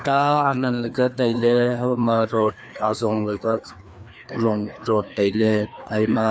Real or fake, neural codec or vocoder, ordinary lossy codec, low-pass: fake; codec, 16 kHz, 2 kbps, FreqCodec, larger model; none; none